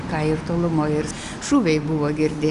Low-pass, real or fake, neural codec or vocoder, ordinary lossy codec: 10.8 kHz; real; none; Opus, 64 kbps